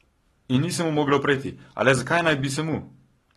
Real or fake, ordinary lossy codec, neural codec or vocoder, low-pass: fake; AAC, 32 kbps; codec, 44.1 kHz, 7.8 kbps, Pupu-Codec; 19.8 kHz